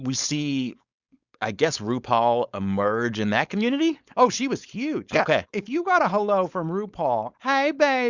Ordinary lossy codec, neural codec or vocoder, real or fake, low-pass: Opus, 64 kbps; codec, 16 kHz, 4.8 kbps, FACodec; fake; 7.2 kHz